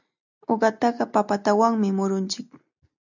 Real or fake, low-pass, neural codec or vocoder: real; 7.2 kHz; none